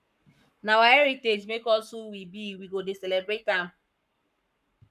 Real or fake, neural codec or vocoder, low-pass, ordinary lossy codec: fake; codec, 44.1 kHz, 7.8 kbps, Pupu-Codec; 14.4 kHz; none